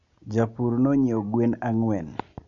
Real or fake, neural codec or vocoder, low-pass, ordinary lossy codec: real; none; 7.2 kHz; none